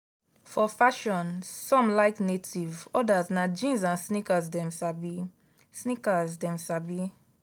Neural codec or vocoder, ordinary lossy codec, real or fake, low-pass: none; none; real; none